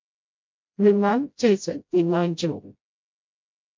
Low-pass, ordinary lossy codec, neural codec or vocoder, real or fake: 7.2 kHz; MP3, 48 kbps; codec, 16 kHz, 0.5 kbps, FreqCodec, smaller model; fake